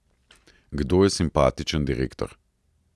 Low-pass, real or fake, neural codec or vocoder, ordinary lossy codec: none; real; none; none